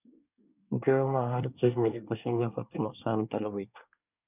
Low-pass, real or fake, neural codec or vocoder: 3.6 kHz; fake; codec, 24 kHz, 1 kbps, SNAC